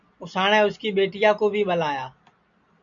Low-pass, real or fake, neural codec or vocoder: 7.2 kHz; real; none